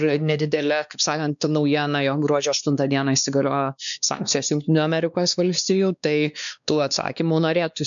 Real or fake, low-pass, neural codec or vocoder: fake; 7.2 kHz; codec, 16 kHz, 2 kbps, X-Codec, WavLM features, trained on Multilingual LibriSpeech